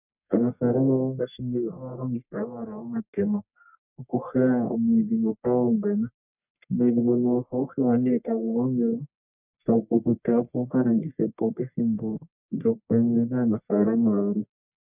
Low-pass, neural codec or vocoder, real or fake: 3.6 kHz; codec, 44.1 kHz, 1.7 kbps, Pupu-Codec; fake